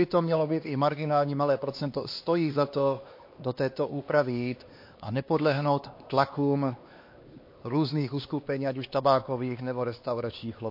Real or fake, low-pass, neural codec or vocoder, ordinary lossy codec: fake; 5.4 kHz; codec, 16 kHz, 2 kbps, X-Codec, HuBERT features, trained on LibriSpeech; MP3, 32 kbps